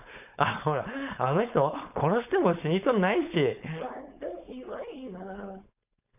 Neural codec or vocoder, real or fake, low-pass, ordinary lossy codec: codec, 16 kHz, 4.8 kbps, FACodec; fake; 3.6 kHz; none